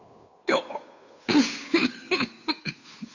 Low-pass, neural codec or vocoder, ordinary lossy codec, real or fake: 7.2 kHz; codec, 16 kHz, 2 kbps, FunCodec, trained on Chinese and English, 25 frames a second; none; fake